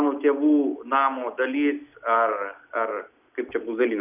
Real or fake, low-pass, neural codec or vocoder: real; 3.6 kHz; none